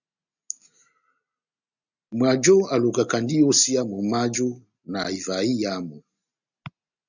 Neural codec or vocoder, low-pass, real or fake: none; 7.2 kHz; real